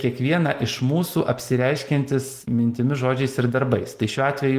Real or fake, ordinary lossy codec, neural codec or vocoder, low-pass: real; Opus, 24 kbps; none; 14.4 kHz